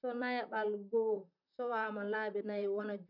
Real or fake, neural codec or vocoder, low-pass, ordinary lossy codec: fake; vocoder, 44.1 kHz, 128 mel bands, Pupu-Vocoder; 5.4 kHz; none